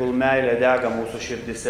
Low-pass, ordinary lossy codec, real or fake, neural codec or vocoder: 19.8 kHz; Opus, 32 kbps; real; none